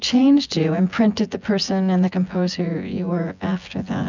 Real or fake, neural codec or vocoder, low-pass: fake; vocoder, 24 kHz, 100 mel bands, Vocos; 7.2 kHz